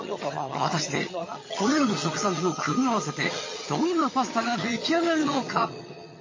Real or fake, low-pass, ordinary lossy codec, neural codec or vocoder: fake; 7.2 kHz; MP3, 32 kbps; vocoder, 22.05 kHz, 80 mel bands, HiFi-GAN